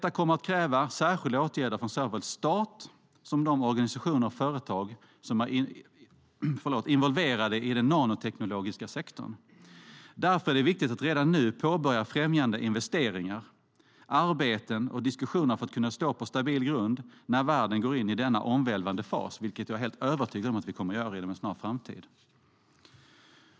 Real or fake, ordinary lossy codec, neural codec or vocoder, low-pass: real; none; none; none